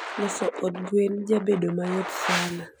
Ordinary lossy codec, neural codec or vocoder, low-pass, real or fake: none; none; none; real